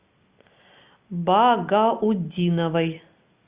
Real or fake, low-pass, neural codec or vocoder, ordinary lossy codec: real; 3.6 kHz; none; Opus, 64 kbps